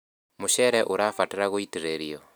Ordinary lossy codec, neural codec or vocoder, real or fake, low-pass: none; none; real; none